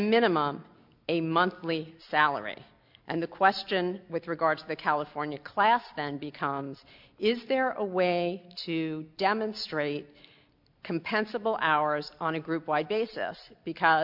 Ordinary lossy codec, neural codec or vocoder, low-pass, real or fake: MP3, 48 kbps; none; 5.4 kHz; real